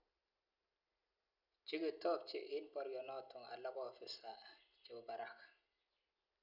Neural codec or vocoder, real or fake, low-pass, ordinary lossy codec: none; real; 5.4 kHz; none